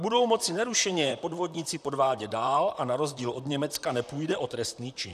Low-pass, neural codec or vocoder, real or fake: 14.4 kHz; vocoder, 44.1 kHz, 128 mel bands, Pupu-Vocoder; fake